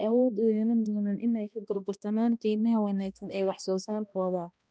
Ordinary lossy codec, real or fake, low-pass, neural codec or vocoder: none; fake; none; codec, 16 kHz, 1 kbps, X-Codec, HuBERT features, trained on balanced general audio